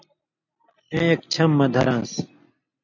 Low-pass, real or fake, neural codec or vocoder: 7.2 kHz; real; none